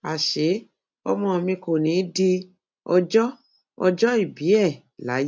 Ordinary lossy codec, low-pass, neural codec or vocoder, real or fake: none; none; none; real